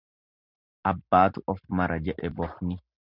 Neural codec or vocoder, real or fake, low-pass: none; real; 5.4 kHz